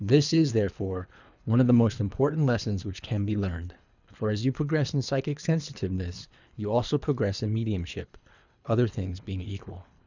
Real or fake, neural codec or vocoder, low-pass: fake; codec, 24 kHz, 3 kbps, HILCodec; 7.2 kHz